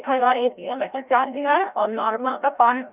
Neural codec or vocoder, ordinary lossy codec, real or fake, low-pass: codec, 16 kHz, 0.5 kbps, FreqCodec, larger model; none; fake; 3.6 kHz